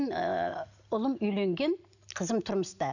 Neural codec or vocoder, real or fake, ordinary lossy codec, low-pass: none; real; none; 7.2 kHz